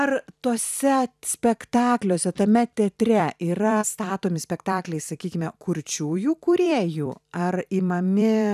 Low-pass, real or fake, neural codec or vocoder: 14.4 kHz; fake; vocoder, 44.1 kHz, 128 mel bands every 256 samples, BigVGAN v2